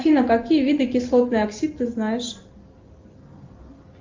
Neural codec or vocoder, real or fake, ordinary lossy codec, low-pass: none; real; Opus, 32 kbps; 7.2 kHz